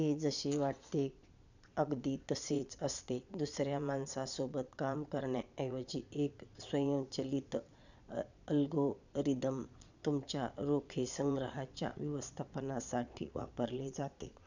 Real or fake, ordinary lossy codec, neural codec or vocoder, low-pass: fake; none; vocoder, 22.05 kHz, 80 mel bands, Vocos; 7.2 kHz